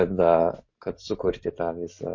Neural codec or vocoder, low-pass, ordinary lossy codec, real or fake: none; 7.2 kHz; MP3, 48 kbps; real